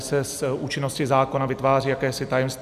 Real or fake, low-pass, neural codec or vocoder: real; 14.4 kHz; none